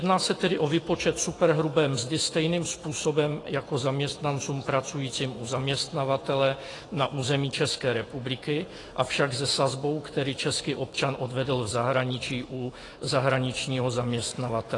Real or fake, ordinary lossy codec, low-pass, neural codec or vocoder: fake; AAC, 32 kbps; 10.8 kHz; autoencoder, 48 kHz, 128 numbers a frame, DAC-VAE, trained on Japanese speech